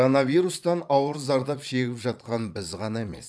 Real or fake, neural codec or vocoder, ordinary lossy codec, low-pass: fake; vocoder, 22.05 kHz, 80 mel bands, Vocos; none; none